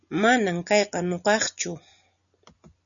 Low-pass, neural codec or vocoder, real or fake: 7.2 kHz; none; real